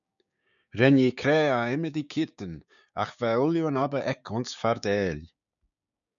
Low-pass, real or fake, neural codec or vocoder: 7.2 kHz; fake; codec, 16 kHz, 6 kbps, DAC